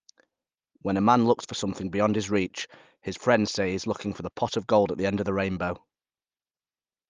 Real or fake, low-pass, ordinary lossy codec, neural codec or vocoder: real; 7.2 kHz; Opus, 32 kbps; none